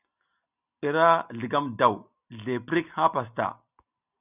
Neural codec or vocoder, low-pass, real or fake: none; 3.6 kHz; real